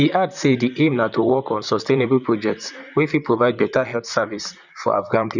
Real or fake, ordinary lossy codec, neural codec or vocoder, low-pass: fake; none; vocoder, 44.1 kHz, 128 mel bands, Pupu-Vocoder; 7.2 kHz